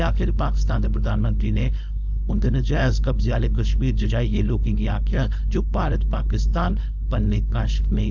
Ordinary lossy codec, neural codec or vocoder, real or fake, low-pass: none; codec, 16 kHz, 4.8 kbps, FACodec; fake; 7.2 kHz